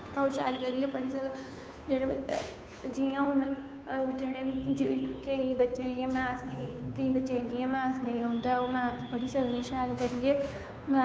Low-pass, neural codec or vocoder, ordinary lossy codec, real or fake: none; codec, 16 kHz, 2 kbps, FunCodec, trained on Chinese and English, 25 frames a second; none; fake